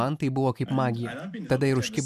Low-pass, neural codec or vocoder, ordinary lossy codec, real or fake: 14.4 kHz; none; AAC, 96 kbps; real